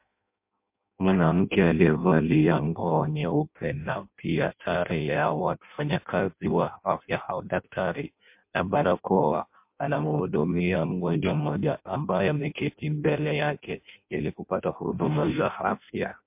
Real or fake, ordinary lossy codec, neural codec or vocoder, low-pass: fake; MP3, 32 kbps; codec, 16 kHz in and 24 kHz out, 0.6 kbps, FireRedTTS-2 codec; 3.6 kHz